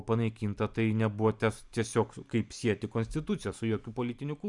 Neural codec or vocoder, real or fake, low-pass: vocoder, 44.1 kHz, 128 mel bands every 512 samples, BigVGAN v2; fake; 10.8 kHz